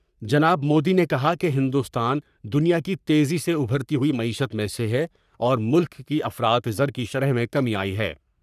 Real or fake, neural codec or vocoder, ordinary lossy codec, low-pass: fake; codec, 44.1 kHz, 3.4 kbps, Pupu-Codec; none; 14.4 kHz